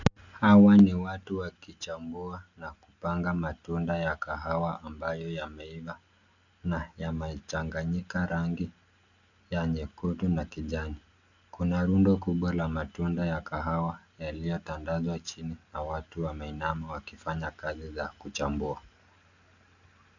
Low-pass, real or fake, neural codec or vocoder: 7.2 kHz; real; none